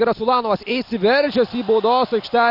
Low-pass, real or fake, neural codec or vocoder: 5.4 kHz; real; none